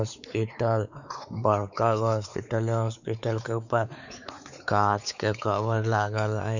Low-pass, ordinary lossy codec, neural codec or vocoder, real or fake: 7.2 kHz; none; codec, 16 kHz, 4 kbps, X-Codec, WavLM features, trained on Multilingual LibriSpeech; fake